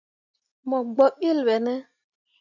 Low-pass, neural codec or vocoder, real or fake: 7.2 kHz; none; real